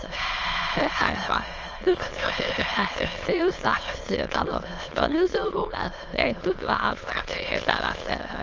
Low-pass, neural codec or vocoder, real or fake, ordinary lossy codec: 7.2 kHz; autoencoder, 22.05 kHz, a latent of 192 numbers a frame, VITS, trained on many speakers; fake; Opus, 24 kbps